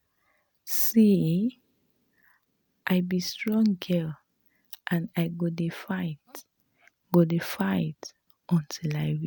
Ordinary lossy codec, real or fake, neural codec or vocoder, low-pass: none; real; none; none